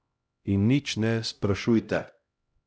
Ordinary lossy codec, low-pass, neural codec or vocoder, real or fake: none; none; codec, 16 kHz, 0.5 kbps, X-Codec, HuBERT features, trained on LibriSpeech; fake